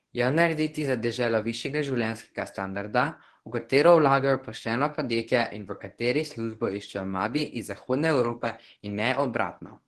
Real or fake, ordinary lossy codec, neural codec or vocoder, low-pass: fake; Opus, 16 kbps; codec, 24 kHz, 0.9 kbps, WavTokenizer, medium speech release version 1; 10.8 kHz